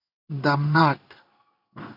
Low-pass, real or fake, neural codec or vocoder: 5.4 kHz; fake; codec, 16 kHz in and 24 kHz out, 1 kbps, XY-Tokenizer